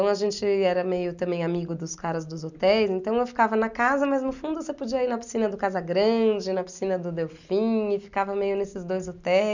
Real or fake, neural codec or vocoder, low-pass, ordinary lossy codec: real; none; 7.2 kHz; none